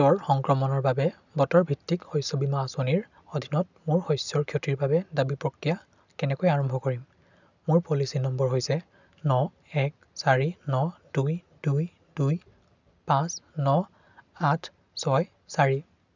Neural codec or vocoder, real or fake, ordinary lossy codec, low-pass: none; real; none; 7.2 kHz